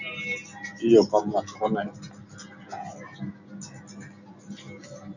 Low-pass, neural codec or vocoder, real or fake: 7.2 kHz; none; real